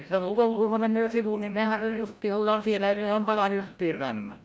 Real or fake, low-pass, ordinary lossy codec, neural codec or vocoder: fake; none; none; codec, 16 kHz, 0.5 kbps, FreqCodec, larger model